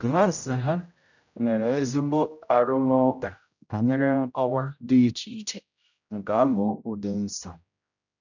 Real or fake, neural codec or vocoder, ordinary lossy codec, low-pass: fake; codec, 16 kHz, 0.5 kbps, X-Codec, HuBERT features, trained on general audio; none; 7.2 kHz